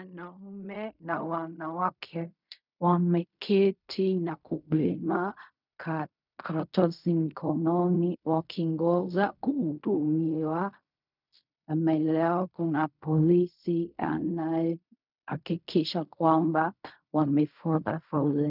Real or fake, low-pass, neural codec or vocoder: fake; 5.4 kHz; codec, 16 kHz in and 24 kHz out, 0.4 kbps, LongCat-Audio-Codec, fine tuned four codebook decoder